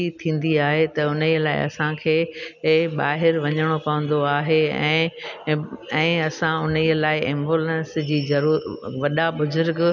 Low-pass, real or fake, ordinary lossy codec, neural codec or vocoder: 7.2 kHz; real; none; none